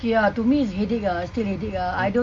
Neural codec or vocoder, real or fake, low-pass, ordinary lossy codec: none; real; 9.9 kHz; MP3, 64 kbps